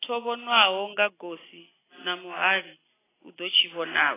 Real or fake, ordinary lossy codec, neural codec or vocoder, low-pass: real; AAC, 16 kbps; none; 3.6 kHz